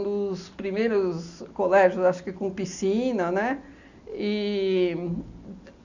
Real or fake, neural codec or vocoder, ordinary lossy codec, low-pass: real; none; none; 7.2 kHz